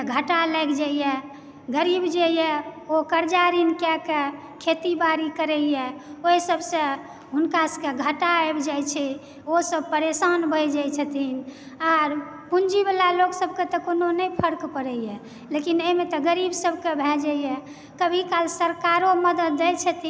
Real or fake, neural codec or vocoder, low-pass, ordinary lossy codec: real; none; none; none